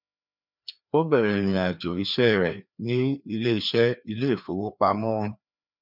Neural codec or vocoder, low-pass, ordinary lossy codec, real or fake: codec, 16 kHz, 2 kbps, FreqCodec, larger model; 5.4 kHz; none; fake